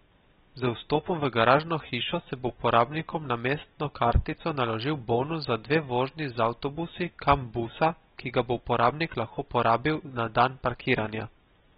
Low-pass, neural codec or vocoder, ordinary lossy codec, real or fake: 9.9 kHz; none; AAC, 16 kbps; real